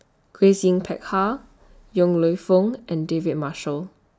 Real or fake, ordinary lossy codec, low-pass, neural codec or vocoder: real; none; none; none